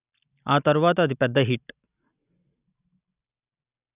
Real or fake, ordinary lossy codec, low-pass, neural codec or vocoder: real; none; 3.6 kHz; none